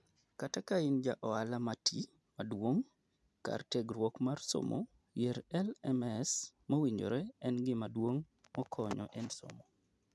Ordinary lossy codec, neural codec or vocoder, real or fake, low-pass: none; none; real; none